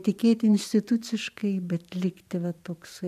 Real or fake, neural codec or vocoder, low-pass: fake; vocoder, 44.1 kHz, 128 mel bands every 512 samples, BigVGAN v2; 14.4 kHz